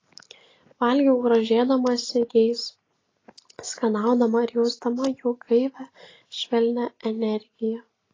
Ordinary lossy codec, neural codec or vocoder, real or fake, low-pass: AAC, 32 kbps; none; real; 7.2 kHz